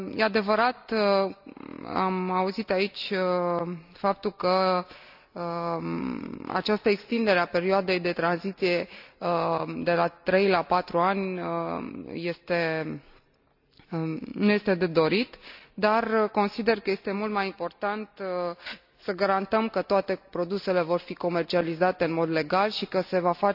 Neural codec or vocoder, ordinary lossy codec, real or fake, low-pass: none; AAC, 48 kbps; real; 5.4 kHz